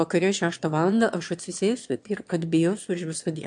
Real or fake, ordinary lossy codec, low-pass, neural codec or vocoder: fake; AAC, 64 kbps; 9.9 kHz; autoencoder, 22.05 kHz, a latent of 192 numbers a frame, VITS, trained on one speaker